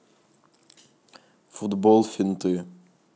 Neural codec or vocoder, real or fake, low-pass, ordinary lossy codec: none; real; none; none